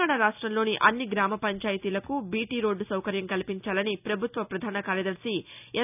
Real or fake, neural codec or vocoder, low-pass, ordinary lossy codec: real; none; 3.6 kHz; none